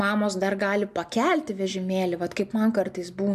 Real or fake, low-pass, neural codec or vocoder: real; 14.4 kHz; none